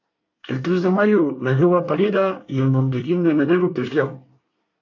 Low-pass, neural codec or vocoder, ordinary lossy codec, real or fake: 7.2 kHz; codec, 24 kHz, 1 kbps, SNAC; AAC, 48 kbps; fake